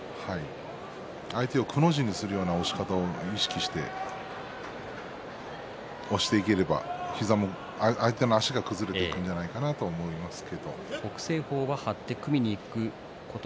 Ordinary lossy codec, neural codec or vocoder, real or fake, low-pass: none; none; real; none